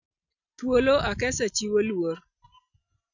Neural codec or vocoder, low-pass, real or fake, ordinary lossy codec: none; 7.2 kHz; real; none